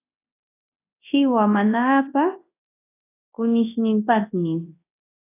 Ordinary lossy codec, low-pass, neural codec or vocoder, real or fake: AAC, 24 kbps; 3.6 kHz; codec, 24 kHz, 0.9 kbps, WavTokenizer, large speech release; fake